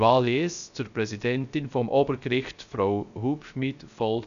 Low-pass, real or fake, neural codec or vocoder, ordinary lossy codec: 7.2 kHz; fake; codec, 16 kHz, 0.3 kbps, FocalCodec; none